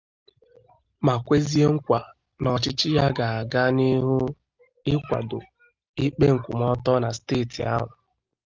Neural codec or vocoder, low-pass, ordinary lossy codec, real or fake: none; 7.2 kHz; Opus, 24 kbps; real